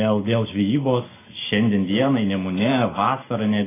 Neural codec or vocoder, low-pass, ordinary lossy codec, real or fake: none; 3.6 kHz; AAC, 16 kbps; real